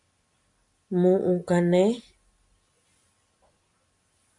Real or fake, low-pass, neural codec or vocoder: real; 10.8 kHz; none